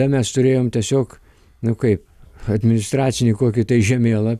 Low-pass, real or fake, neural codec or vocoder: 14.4 kHz; real; none